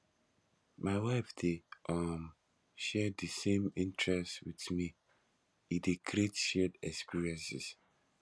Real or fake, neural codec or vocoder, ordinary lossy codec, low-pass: real; none; none; none